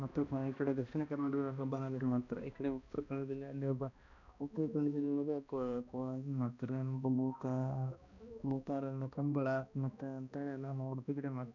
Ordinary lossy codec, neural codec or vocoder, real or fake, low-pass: none; codec, 16 kHz, 1 kbps, X-Codec, HuBERT features, trained on balanced general audio; fake; 7.2 kHz